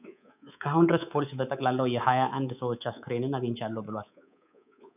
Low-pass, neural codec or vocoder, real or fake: 3.6 kHz; codec, 24 kHz, 3.1 kbps, DualCodec; fake